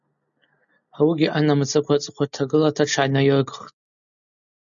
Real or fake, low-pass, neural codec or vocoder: real; 7.2 kHz; none